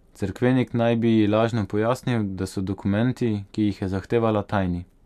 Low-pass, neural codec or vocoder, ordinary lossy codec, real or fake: 14.4 kHz; none; none; real